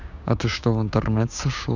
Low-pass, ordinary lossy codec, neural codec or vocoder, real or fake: 7.2 kHz; none; codec, 16 kHz, 6 kbps, DAC; fake